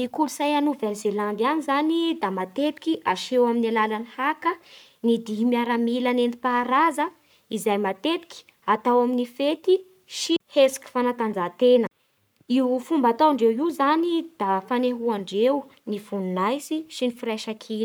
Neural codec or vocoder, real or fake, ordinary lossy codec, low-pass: codec, 44.1 kHz, 7.8 kbps, Pupu-Codec; fake; none; none